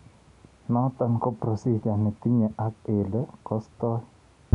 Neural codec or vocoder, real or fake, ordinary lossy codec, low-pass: none; real; none; 10.8 kHz